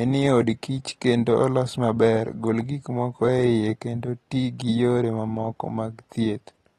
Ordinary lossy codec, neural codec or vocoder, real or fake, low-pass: AAC, 32 kbps; none; real; 14.4 kHz